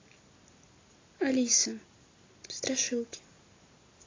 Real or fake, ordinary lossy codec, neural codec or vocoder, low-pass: real; AAC, 32 kbps; none; 7.2 kHz